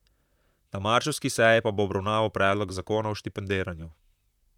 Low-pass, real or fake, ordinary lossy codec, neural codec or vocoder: 19.8 kHz; fake; none; vocoder, 44.1 kHz, 128 mel bands, Pupu-Vocoder